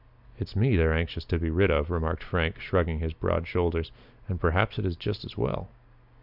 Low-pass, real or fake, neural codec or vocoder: 5.4 kHz; real; none